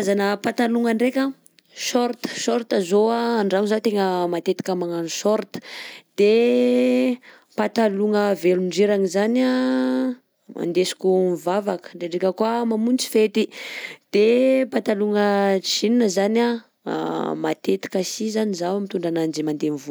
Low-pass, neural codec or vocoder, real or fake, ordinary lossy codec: none; none; real; none